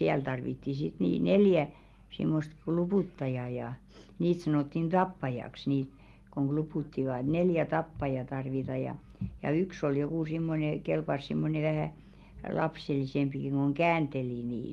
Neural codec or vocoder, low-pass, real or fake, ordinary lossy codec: none; 19.8 kHz; real; Opus, 24 kbps